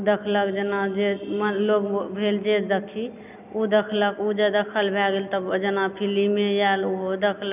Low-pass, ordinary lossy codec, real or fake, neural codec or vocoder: 3.6 kHz; none; real; none